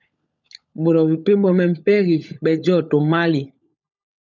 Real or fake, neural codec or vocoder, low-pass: fake; codec, 16 kHz, 16 kbps, FunCodec, trained on LibriTTS, 50 frames a second; 7.2 kHz